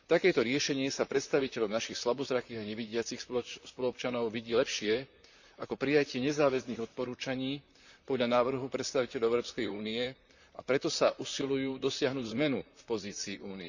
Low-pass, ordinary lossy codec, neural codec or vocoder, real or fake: 7.2 kHz; none; vocoder, 44.1 kHz, 128 mel bands, Pupu-Vocoder; fake